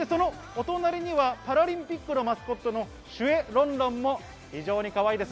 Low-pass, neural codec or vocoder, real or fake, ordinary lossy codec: none; none; real; none